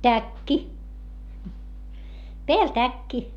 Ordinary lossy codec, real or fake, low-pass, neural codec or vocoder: none; real; 19.8 kHz; none